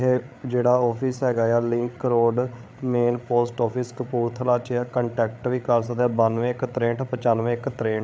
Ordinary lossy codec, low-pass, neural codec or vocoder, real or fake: none; none; codec, 16 kHz, 8 kbps, FreqCodec, larger model; fake